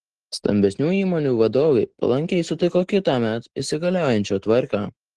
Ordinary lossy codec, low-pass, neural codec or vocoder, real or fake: Opus, 16 kbps; 10.8 kHz; none; real